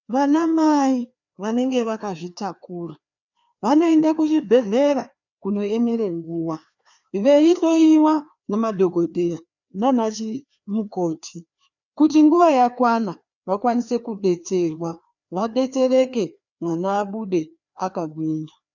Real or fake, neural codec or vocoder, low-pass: fake; codec, 16 kHz, 2 kbps, FreqCodec, larger model; 7.2 kHz